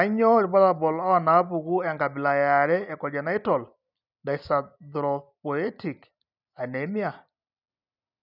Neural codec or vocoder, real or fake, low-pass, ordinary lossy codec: none; real; 5.4 kHz; none